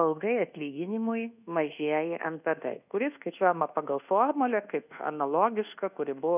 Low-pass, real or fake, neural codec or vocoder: 3.6 kHz; fake; codec, 24 kHz, 1.2 kbps, DualCodec